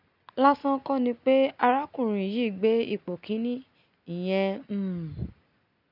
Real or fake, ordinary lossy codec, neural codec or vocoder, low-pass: real; none; none; 5.4 kHz